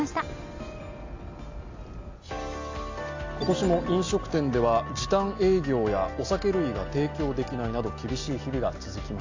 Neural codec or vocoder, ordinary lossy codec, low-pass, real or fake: none; AAC, 48 kbps; 7.2 kHz; real